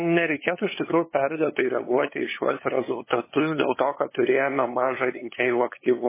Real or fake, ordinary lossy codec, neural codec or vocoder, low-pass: fake; MP3, 16 kbps; codec, 16 kHz, 8 kbps, FunCodec, trained on LibriTTS, 25 frames a second; 3.6 kHz